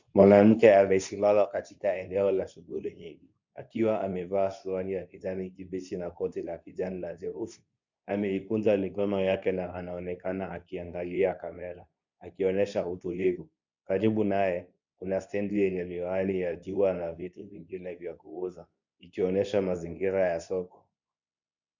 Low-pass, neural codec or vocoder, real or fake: 7.2 kHz; codec, 24 kHz, 0.9 kbps, WavTokenizer, medium speech release version 2; fake